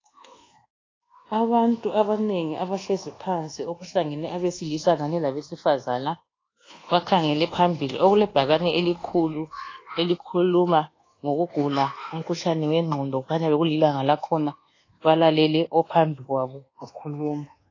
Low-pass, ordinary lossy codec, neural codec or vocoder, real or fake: 7.2 kHz; AAC, 32 kbps; codec, 24 kHz, 1.2 kbps, DualCodec; fake